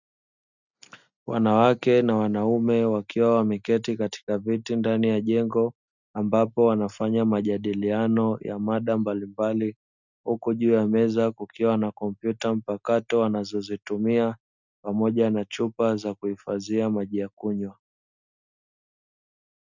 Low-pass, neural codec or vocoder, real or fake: 7.2 kHz; none; real